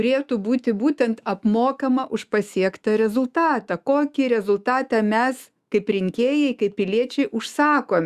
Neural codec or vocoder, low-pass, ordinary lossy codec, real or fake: autoencoder, 48 kHz, 128 numbers a frame, DAC-VAE, trained on Japanese speech; 14.4 kHz; Opus, 64 kbps; fake